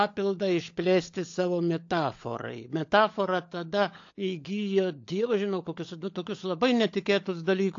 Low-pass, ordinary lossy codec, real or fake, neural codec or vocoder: 7.2 kHz; AAC, 48 kbps; fake; codec, 16 kHz, 16 kbps, FunCodec, trained on LibriTTS, 50 frames a second